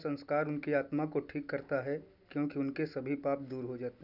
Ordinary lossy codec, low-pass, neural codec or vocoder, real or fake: none; 5.4 kHz; none; real